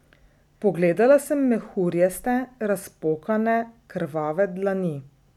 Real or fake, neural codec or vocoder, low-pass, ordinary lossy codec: real; none; 19.8 kHz; none